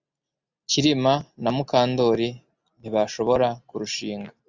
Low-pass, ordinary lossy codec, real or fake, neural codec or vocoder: 7.2 kHz; Opus, 64 kbps; real; none